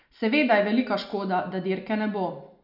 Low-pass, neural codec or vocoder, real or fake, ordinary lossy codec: 5.4 kHz; none; real; none